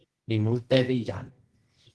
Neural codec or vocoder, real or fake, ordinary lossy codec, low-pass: codec, 24 kHz, 0.9 kbps, WavTokenizer, medium music audio release; fake; Opus, 16 kbps; 10.8 kHz